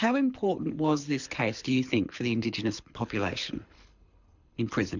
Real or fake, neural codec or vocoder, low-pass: fake; codec, 24 kHz, 6 kbps, HILCodec; 7.2 kHz